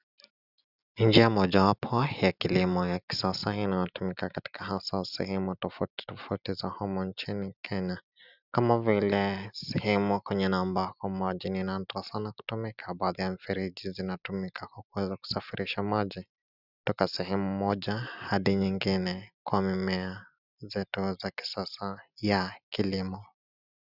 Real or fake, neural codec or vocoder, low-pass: real; none; 5.4 kHz